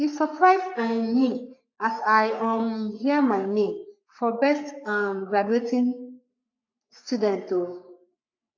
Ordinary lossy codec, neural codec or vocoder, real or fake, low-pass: AAC, 48 kbps; codec, 44.1 kHz, 3.4 kbps, Pupu-Codec; fake; 7.2 kHz